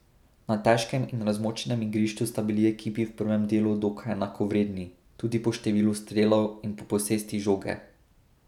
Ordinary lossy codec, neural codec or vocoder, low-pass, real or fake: none; none; 19.8 kHz; real